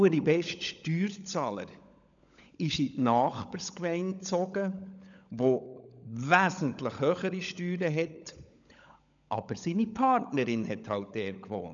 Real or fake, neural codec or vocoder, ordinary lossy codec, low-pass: fake; codec, 16 kHz, 16 kbps, FunCodec, trained on LibriTTS, 50 frames a second; none; 7.2 kHz